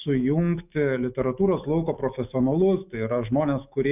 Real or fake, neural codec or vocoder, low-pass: fake; autoencoder, 48 kHz, 128 numbers a frame, DAC-VAE, trained on Japanese speech; 3.6 kHz